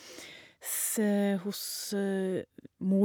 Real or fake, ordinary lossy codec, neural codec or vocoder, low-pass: real; none; none; none